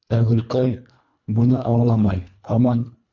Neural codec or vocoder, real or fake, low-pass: codec, 24 kHz, 1.5 kbps, HILCodec; fake; 7.2 kHz